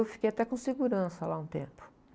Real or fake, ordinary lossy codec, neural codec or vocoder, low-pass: real; none; none; none